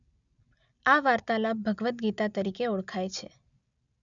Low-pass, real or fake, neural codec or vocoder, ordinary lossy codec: 7.2 kHz; real; none; none